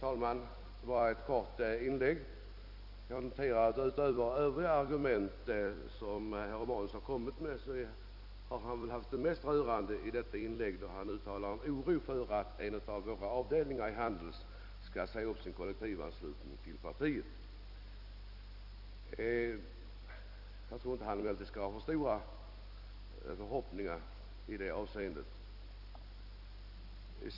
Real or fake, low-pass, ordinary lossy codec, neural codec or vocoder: fake; 5.4 kHz; none; vocoder, 44.1 kHz, 128 mel bands every 256 samples, BigVGAN v2